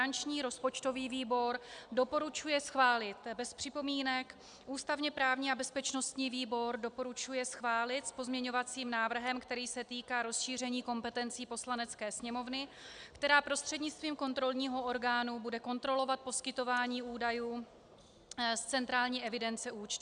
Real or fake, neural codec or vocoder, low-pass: real; none; 9.9 kHz